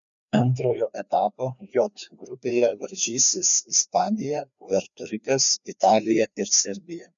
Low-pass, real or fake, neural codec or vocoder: 7.2 kHz; fake; codec, 16 kHz, 2 kbps, FreqCodec, larger model